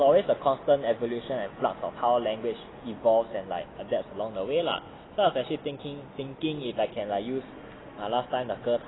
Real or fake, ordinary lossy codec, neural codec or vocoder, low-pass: real; AAC, 16 kbps; none; 7.2 kHz